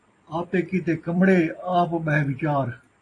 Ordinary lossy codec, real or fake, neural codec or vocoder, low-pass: AAC, 32 kbps; fake; vocoder, 44.1 kHz, 128 mel bands every 256 samples, BigVGAN v2; 10.8 kHz